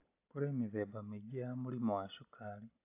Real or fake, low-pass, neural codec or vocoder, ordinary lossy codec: real; 3.6 kHz; none; AAC, 32 kbps